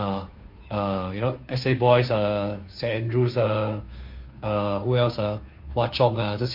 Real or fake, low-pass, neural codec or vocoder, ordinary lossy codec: fake; 5.4 kHz; codec, 16 kHz, 2 kbps, FunCodec, trained on Chinese and English, 25 frames a second; MP3, 32 kbps